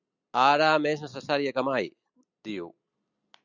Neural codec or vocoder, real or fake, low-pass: none; real; 7.2 kHz